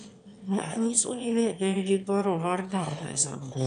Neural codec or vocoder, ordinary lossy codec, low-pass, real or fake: autoencoder, 22.05 kHz, a latent of 192 numbers a frame, VITS, trained on one speaker; none; 9.9 kHz; fake